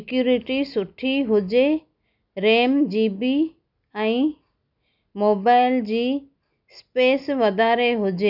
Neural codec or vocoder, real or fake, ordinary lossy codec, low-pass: none; real; none; 5.4 kHz